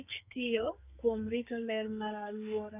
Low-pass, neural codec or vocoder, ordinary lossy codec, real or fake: 3.6 kHz; codec, 32 kHz, 1.9 kbps, SNAC; none; fake